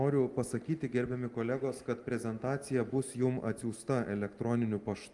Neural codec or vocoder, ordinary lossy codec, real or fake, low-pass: none; Opus, 32 kbps; real; 10.8 kHz